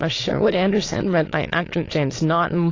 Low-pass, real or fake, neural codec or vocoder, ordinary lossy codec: 7.2 kHz; fake; autoencoder, 22.05 kHz, a latent of 192 numbers a frame, VITS, trained on many speakers; AAC, 32 kbps